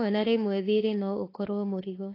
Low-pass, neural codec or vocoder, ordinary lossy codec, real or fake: 5.4 kHz; autoencoder, 48 kHz, 32 numbers a frame, DAC-VAE, trained on Japanese speech; AAC, 24 kbps; fake